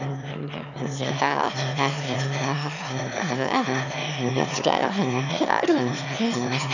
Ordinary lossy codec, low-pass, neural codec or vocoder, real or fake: none; 7.2 kHz; autoencoder, 22.05 kHz, a latent of 192 numbers a frame, VITS, trained on one speaker; fake